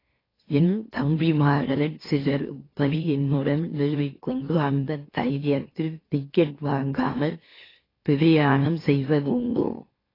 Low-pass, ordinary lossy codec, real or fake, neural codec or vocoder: 5.4 kHz; AAC, 24 kbps; fake; autoencoder, 44.1 kHz, a latent of 192 numbers a frame, MeloTTS